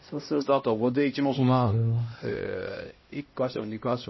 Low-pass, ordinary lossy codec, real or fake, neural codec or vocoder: 7.2 kHz; MP3, 24 kbps; fake; codec, 16 kHz, 1 kbps, X-Codec, HuBERT features, trained on LibriSpeech